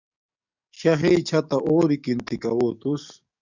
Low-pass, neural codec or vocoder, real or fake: 7.2 kHz; codec, 44.1 kHz, 7.8 kbps, DAC; fake